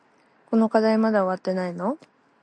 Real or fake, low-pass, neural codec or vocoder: real; 9.9 kHz; none